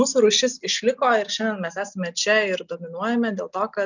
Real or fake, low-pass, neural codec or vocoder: real; 7.2 kHz; none